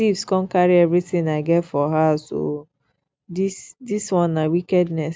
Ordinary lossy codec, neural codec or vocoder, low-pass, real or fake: none; none; none; real